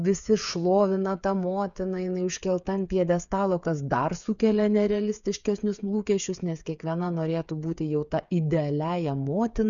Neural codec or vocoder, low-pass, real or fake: codec, 16 kHz, 8 kbps, FreqCodec, smaller model; 7.2 kHz; fake